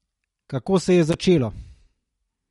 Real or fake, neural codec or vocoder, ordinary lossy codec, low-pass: real; none; MP3, 48 kbps; 19.8 kHz